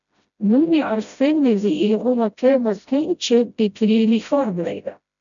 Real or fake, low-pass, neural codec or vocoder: fake; 7.2 kHz; codec, 16 kHz, 0.5 kbps, FreqCodec, smaller model